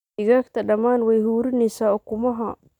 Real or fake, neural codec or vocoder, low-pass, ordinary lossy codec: real; none; 19.8 kHz; none